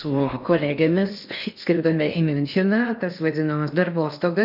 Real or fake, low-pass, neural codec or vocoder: fake; 5.4 kHz; codec, 16 kHz in and 24 kHz out, 0.6 kbps, FocalCodec, streaming, 4096 codes